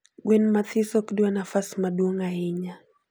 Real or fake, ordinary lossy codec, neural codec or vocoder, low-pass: real; none; none; none